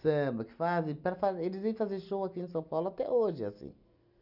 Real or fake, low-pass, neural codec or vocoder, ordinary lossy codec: real; 5.4 kHz; none; none